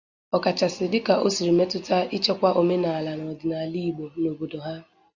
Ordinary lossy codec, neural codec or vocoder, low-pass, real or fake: Opus, 64 kbps; none; 7.2 kHz; real